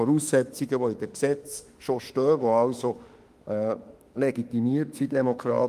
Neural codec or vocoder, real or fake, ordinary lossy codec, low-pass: autoencoder, 48 kHz, 32 numbers a frame, DAC-VAE, trained on Japanese speech; fake; Opus, 32 kbps; 14.4 kHz